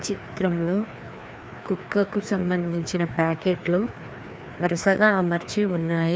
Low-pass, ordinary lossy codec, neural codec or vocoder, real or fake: none; none; codec, 16 kHz, 2 kbps, FreqCodec, larger model; fake